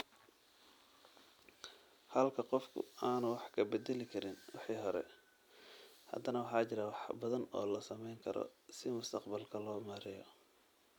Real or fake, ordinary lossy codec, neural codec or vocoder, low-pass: real; none; none; none